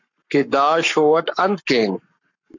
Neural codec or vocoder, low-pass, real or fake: codec, 44.1 kHz, 7.8 kbps, Pupu-Codec; 7.2 kHz; fake